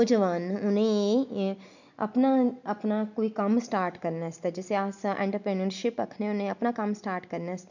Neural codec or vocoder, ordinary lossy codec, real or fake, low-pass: none; none; real; 7.2 kHz